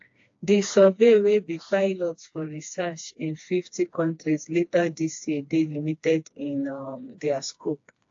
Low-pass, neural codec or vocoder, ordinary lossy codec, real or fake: 7.2 kHz; codec, 16 kHz, 2 kbps, FreqCodec, smaller model; AAC, 48 kbps; fake